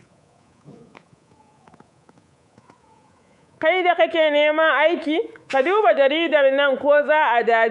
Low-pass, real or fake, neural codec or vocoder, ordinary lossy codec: 10.8 kHz; fake; codec, 24 kHz, 3.1 kbps, DualCodec; none